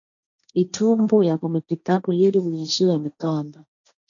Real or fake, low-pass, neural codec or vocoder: fake; 7.2 kHz; codec, 16 kHz, 1.1 kbps, Voila-Tokenizer